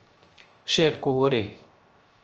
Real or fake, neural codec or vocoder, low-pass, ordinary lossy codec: fake; codec, 16 kHz, 0.3 kbps, FocalCodec; 7.2 kHz; Opus, 24 kbps